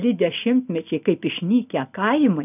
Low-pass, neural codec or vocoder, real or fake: 3.6 kHz; none; real